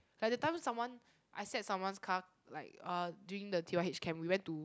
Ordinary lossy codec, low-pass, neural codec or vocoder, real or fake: none; none; none; real